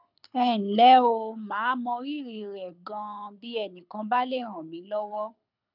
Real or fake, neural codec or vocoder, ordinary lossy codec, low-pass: fake; codec, 24 kHz, 6 kbps, HILCodec; none; 5.4 kHz